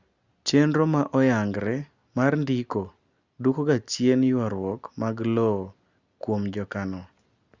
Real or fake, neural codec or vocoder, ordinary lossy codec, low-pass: real; none; Opus, 64 kbps; 7.2 kHz